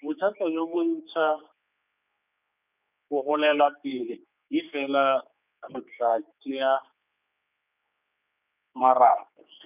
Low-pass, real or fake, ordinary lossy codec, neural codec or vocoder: 3.6 kHz; fake; none; codec, 16 kHz, 4 kbps, X-Codec, HuBERT features, trained on general audio